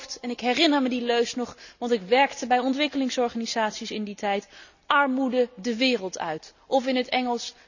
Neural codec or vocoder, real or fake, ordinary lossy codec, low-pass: none; real; none; 7.2 kHz